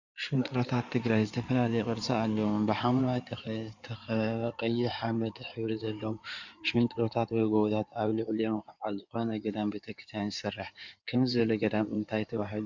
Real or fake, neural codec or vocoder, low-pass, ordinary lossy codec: fake; codec, 16 kHz in and 24 kHz out, 2.2 kbps, FireRedTTS-2 codec; 7.2 kHz; Opus, 64 kbps